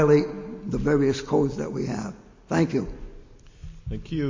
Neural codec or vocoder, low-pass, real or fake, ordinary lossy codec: none; 7.2 kHz; real; MP3, 32 kbps